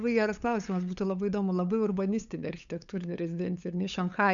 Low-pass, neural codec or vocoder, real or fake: 7.2 kHz; codec, 16 kHz, 8 kbps, FunCodec, trained on LibriTTS, 25 frames a second; fake